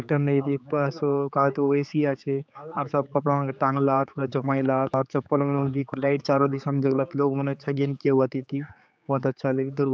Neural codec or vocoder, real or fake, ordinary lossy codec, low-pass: codec, 16 kHz, 4 kbps, X-Codec, HuBERT features, trained on general audio; fake; none; none